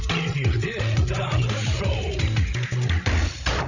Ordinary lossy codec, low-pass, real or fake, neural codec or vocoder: none; 7.2 kHz; fake; codec, 16 kHz, 16 kbps, FreqCodec, larger model